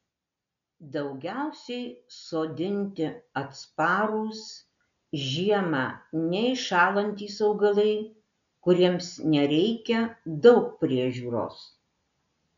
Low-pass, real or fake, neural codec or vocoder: 7.2 kHz; real; none